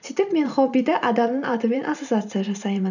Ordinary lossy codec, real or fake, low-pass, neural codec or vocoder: none; fake; 7.2 kHz; vocoder, 44.1 kHz, 128 mel bands every 512 samples, BigVGAN v2